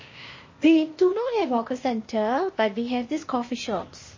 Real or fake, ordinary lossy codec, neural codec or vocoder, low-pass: fake; MP3, 32 kbps; codec, 16 kHz, 0.8 kbps, ZipCodec; 7.2 kHz